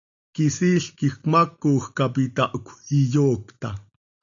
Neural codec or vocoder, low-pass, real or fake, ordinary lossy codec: none; 7.2 kHz; real; MP3, 64 kbps